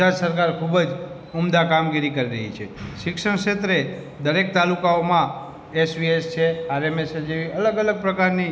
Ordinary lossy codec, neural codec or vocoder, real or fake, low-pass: none; none; real; none